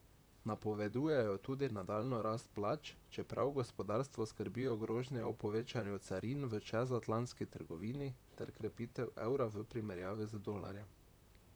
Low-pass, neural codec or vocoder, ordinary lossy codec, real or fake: none; vocoder, 44.1 kHz, 128 mel bands, Pupu-Vocoder; none; fake